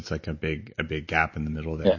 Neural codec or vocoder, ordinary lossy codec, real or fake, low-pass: none; MP3, 32 kbps; real; 7.2 kHz